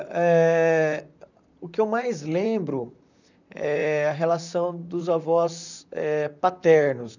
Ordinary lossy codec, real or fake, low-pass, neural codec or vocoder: none; fake; 7.2 kHz; vocoder, 44.1 kHz, 128 mel bands, Pupu-Vocoder